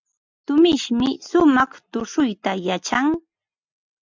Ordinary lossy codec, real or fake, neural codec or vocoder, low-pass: MP3, 64 kbps; real; none; 7.2 kHz